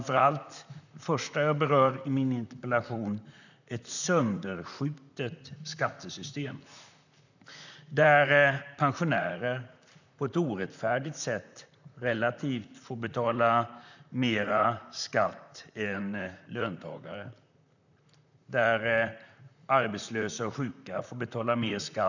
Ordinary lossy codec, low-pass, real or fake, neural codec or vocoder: none; 7.2 kHz; fake; vocoder, 44.1 kHz, 128 mel bands, Pupu-Vocoder